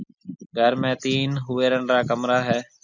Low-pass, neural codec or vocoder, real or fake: 7.2 kHz; none; real